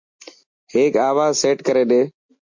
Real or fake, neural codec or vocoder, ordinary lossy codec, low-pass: real; none; MP3, 48 kbps; 7.2 kHz